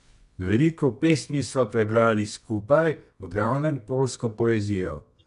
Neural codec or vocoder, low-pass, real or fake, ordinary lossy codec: codec, 24 kHz, 0.9 kbps, WavTokenizer, medium music audio release; 10.8 kHz; fake; none